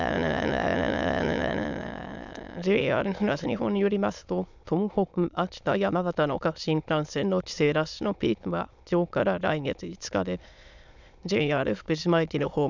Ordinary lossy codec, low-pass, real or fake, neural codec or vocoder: none; 7.2 kHz; fake; autoencoder, 22.05 kHz, a latent of 192 numbers a frame, VITS, trained on many speakers